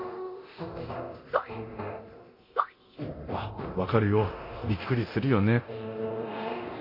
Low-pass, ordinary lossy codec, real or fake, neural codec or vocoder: 5.4 kHz; MP3, 32 kbps; fake; codec, 24 kHz, 0.9 kbps, DualCodec